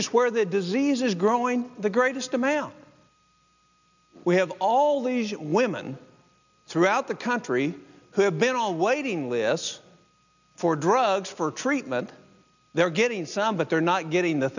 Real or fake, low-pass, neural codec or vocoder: real; 7.2 kHz; none